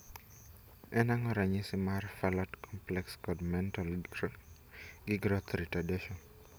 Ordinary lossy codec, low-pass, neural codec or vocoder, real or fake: none; none; none; real